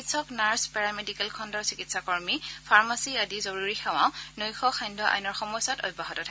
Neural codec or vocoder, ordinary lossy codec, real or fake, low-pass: none; none; real; none